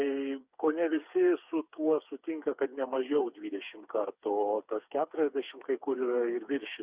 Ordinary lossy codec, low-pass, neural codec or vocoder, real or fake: Opus, 64 kbps; 3.6 kHz; codec, 16 kHz, 4 kbps, FreqCodec, smaller model; fake